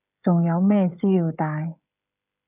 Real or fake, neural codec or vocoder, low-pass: fake; codec, 16 kHz, 16 kbps, FreqCodec, smaller model; 3.6 kHz